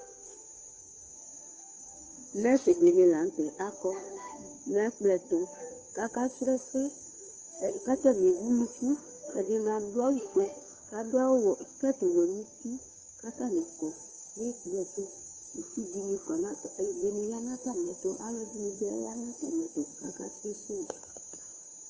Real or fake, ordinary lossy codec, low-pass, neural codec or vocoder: fake; Opus, 24 kbps; 7.2 kHz; codec, 16 kHz, 2 kbps, FunCodec, trained on Chinese and English, 25 frames a second